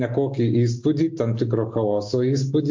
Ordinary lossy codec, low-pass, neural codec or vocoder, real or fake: MP3, 48 kbps; 7.2 kHz; none; real